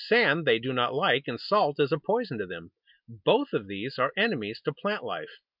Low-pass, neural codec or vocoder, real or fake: 5.4 kHz; none; real